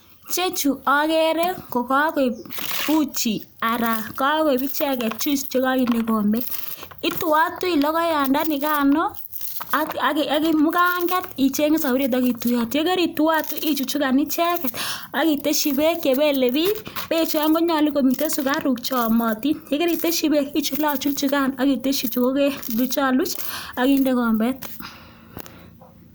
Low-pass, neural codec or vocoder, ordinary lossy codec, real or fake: none; none; none; real